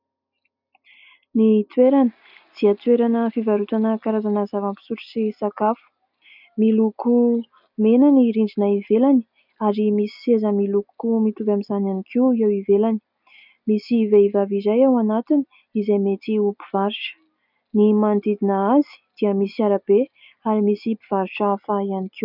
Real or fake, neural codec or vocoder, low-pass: real; none; 5.4 kHz